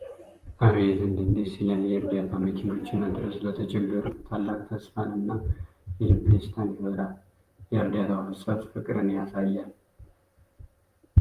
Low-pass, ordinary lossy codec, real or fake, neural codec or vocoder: 14.4 kHz; Opus, 32 kbps; fake; vocoder, 44.1 kHz, 128 mel bands, Pupu-Vocoder